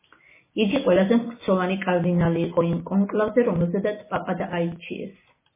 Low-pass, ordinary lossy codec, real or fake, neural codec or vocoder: 3.6 kHz; MP3, 16 kbps; real; none